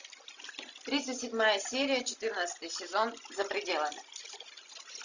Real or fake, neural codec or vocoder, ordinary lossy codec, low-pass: real; none; Opus, 64 kbps; 7.2 kHz